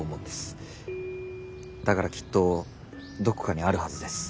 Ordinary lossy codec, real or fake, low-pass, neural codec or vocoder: none; real; none; none